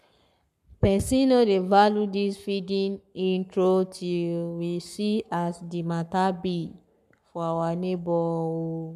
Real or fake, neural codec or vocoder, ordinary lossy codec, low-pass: fake; codec, 44.1 kHz, 7.8 kbps, Pupu-Codec; none; 14.4 kHz